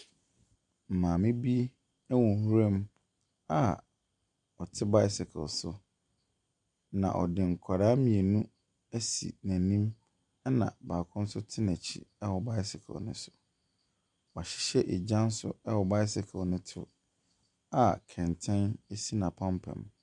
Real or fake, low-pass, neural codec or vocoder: real; 10.8 kHz; none